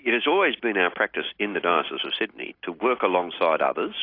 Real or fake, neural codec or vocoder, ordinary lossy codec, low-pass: real; none; AAC, 32 kbps; 5.4 kHz